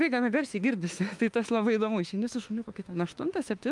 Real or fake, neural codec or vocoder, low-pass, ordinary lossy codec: fake; autoencoder, 48 kHz, 32 numbers a frame, DAC-VAE, trained on Japanese speech; 10.8 kHz; Opus, 32 kbps